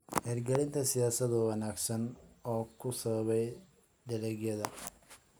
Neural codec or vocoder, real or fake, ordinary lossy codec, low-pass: none; real; none; none